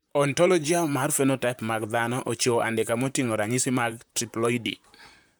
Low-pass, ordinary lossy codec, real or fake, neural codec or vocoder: none; none; fake; vocoder, 44.1 kHz, 128 mel bands, Pupu-Vocoder